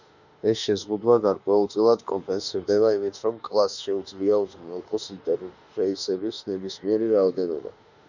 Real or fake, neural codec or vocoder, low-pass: fake; autoencoder, 48 kHz, 32 numbers a frame, DAC-VAE, trained on Japanese speech; 7.2 kHz